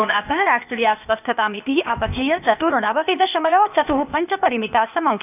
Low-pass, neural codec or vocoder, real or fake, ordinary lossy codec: 3.6 kHz; codec, 16 kHz, 0.8 kbps, ZipCodec; fake; none